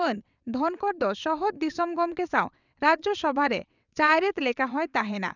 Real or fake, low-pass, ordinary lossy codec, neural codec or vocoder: fake; 7.2 kHz; none; vocoder, 22.05 kHz, 80 mel bands, WaveNeXt